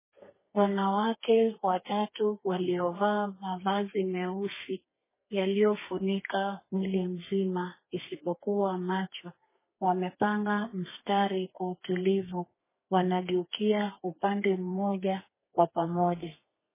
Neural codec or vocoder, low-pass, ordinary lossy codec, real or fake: codec, 32 kHz, 1.9 kbps, SNAC; 3.6 kHz; MP3, 16 kbps; fake